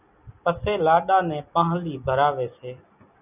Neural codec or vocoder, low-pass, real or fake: none; 3.6 kHz; real